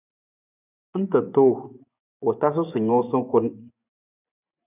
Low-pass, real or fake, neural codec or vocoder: 3.6 kHz; fake; vocoder, 24 kHz, 100 mel bands, Vocos